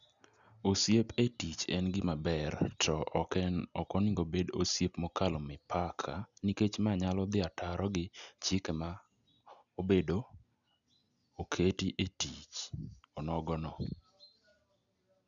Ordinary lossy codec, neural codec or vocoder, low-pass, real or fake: none; none; 7.2 kHz; real